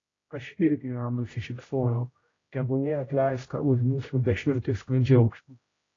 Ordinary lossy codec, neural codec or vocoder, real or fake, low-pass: AAC, 32 kbps; codec, 16 kHz, 0.5 kbps, X-Codec, HuBERT features, trained on general audio; fake; 7.2 kHz